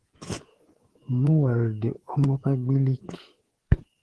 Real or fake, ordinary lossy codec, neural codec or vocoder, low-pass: fake; Opus, 16 kbps; codec, 24 kHz, 3.1 kbps, DualCodec; 10.8 kHz